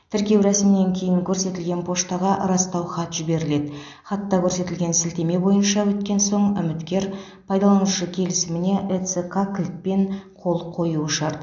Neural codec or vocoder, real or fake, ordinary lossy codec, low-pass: none; real; AAC, 64 kbps; 7.2 kHz